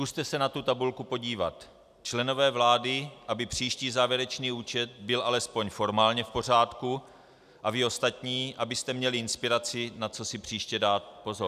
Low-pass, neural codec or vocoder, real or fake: 14.4 kHz; none; real